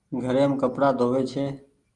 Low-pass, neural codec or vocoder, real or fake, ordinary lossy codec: 10.8 kHz; none; real; Opus, 24 kbps